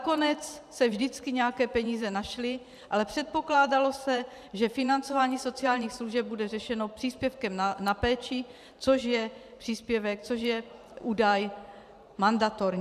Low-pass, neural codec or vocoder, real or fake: 14.4 kHz; vocoder, 44.1 kHz, 128 mel bands every 512 samples, BigVGAN v2; fake